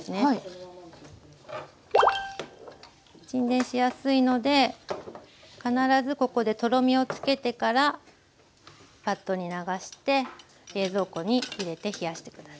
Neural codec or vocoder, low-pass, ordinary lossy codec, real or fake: none; none; none; real